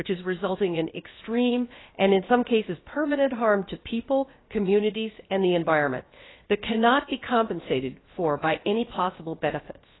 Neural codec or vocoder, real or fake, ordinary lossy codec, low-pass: codec, 16 kHz, about 1 kbps, DyCAST, with the encoder's durations; fake; AAC, 16 kbps; 7.2 kHz